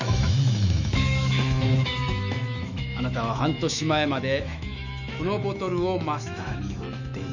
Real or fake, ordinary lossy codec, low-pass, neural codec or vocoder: fake; none; 7.2 kHz; autoencoder, 48 kHz, 128 numbers a frame, DAC-VAE, trained on Japanese speech